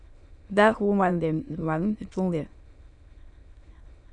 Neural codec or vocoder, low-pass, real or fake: autoencoder, 22.05 kHz, a latent of 192 numbers a frame, VITS, trained on many speakers; 9.9 kHz; fake